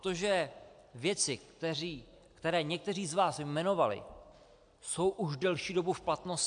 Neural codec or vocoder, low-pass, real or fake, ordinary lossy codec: none; 9.9 kHz; real; AAC, 64 kbps